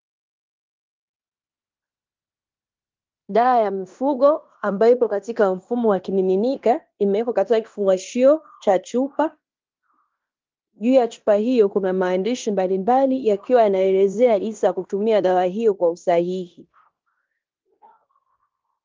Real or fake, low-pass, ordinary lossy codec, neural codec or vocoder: fake; 7.2 kHz; Opus, 32 kbps; codec, 16 kHz in and 24 kHz out, 0.9 kbps, LongCat-Audio-Codec, fine tuned four codebook decoder